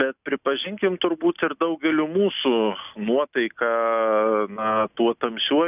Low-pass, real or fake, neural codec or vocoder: 3.6 kHz; real; none